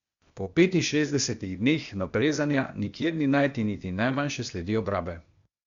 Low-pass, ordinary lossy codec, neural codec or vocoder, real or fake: 7.2 kHz; Opus, 64 kbps; codec, 16 kHz, 0.8 kbps, ZipCodec; fake